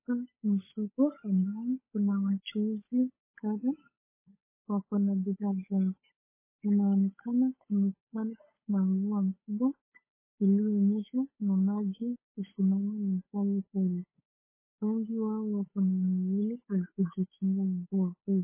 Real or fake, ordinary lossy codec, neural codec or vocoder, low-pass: fake; MP3, 16 kbps; codec, 16 kHz, 8 kbps, FunCodec, trained on Chinese and English, 25 frames a second; 3.6 kHz